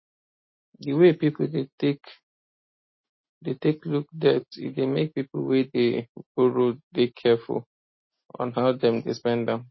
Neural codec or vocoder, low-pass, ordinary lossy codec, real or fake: none; 7.2 kHz; MP3, 24 kbps; real